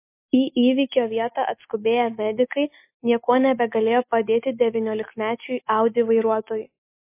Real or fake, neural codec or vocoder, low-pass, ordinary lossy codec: real; none; 3.6 kHz; MP3, 24 kbps